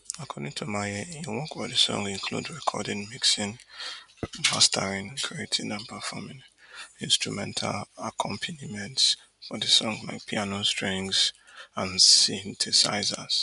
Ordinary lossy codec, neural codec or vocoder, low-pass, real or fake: none; none; 10.8 kHz; real